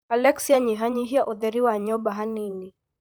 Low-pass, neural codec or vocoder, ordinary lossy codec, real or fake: none; vocoder, 44.1 kHz, 128 mel bands, Pupu-Vocoder; none; fake